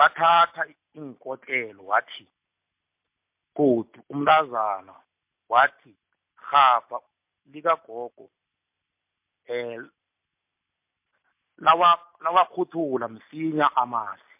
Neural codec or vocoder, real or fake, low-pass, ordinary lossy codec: none; real; 3.6 kHz; MP3, 32 kbps